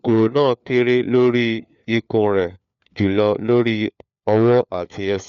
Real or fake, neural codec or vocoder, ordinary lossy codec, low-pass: fake; codec, 16 kHz, 4 kbps, FunCodec, trained on LibriTTS, 50 frames a second; none; 7.2 kHz